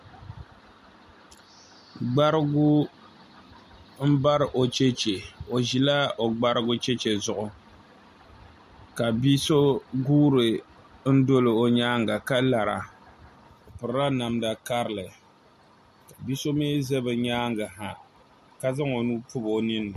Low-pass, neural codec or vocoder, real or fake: 14.4 kHz; none; real